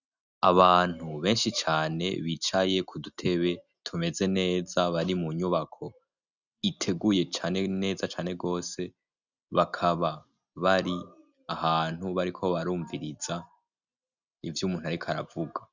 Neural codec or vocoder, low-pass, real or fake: none; 7.2 kHz; real